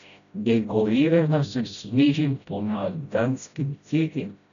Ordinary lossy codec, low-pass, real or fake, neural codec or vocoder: none; 7.2 kHz; fake; codec, 16 kHz, 0.5 kbps, FreqCodec, smaller model